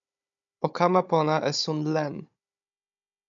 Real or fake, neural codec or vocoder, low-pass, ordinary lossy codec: fake; codec, 16 kHz, 16 kbps, FunCodec, trained on Chinese and English, 50 frames a second; 7.2 kHz; MP3, 64 kbps